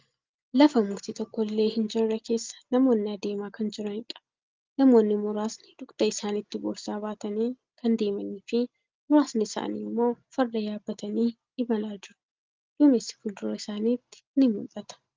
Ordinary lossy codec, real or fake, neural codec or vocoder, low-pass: Opus, 32 kbps; real; none; 7.2 kHz